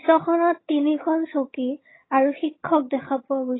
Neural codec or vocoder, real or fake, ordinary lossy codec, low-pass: vocoder, 22.05 kHz, 80 mel bands, HiFi-GAN; fake; AAC, 16 kbps; 7.2 kHz